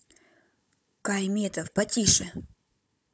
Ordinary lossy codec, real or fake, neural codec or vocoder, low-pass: none; fake; codec, 16 kHz, 16 kbps, FunCodec, trained on Chinese and English, 50 frames a second; none